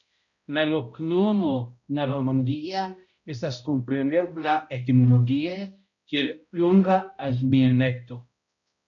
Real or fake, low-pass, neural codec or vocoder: fake; 7.2 kHz; codec, 16 kHz, 0.5 kbps, X-Codec, HuBERT features, trained on balanced general audio